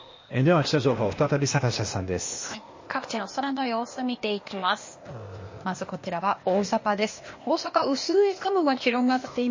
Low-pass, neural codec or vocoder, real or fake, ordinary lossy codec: 7.2 kHz; codec, 16 kHz, 0.8 kbps, ZipCodec; fake; MP3, 32 kbps